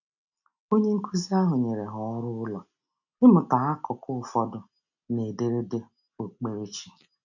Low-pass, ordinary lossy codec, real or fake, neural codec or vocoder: 7.2 kHz; none; real; none